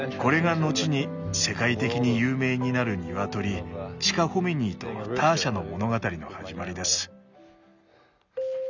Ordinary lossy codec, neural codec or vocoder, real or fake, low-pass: none; none; real; 7.2 kHz